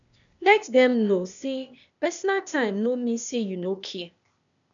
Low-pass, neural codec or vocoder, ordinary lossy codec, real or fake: 7.2 kHz; codec, 16 kHz, 0.8 kbps, ZipCodec; MP3, 96 kbps; fake